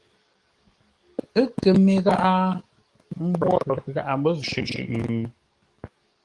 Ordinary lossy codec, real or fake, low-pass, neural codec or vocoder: Opus, 24 kbps; fake; 10.8 kHz; codec, 44.1 kHz, 7.8 kbps, DAC